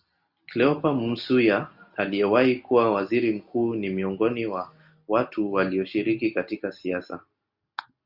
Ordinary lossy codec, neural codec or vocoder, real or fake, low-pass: Opus, 64 kbps; none; real; 5.4 kHz